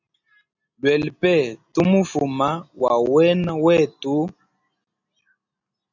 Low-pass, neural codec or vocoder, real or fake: 7.2 kHz; none; real